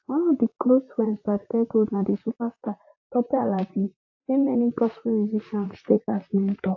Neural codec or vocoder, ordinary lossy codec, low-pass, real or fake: vocoder, 44.1 kHz, 128 mel bands, Pupu-Vocoder; AAC, 48 kbps; 7.2 kHz; fake